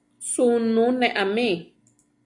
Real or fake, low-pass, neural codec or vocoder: real; 10.8 kHz; none